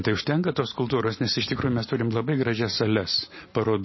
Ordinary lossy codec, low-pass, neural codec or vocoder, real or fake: MP3, 24 kbps; 7.2 kHz; none; real